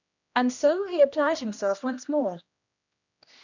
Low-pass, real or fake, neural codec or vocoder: 7.2 kHz; fake; codec, 16 kHz, 1 kbps, X-Codec, HuBERT features, trained on general audio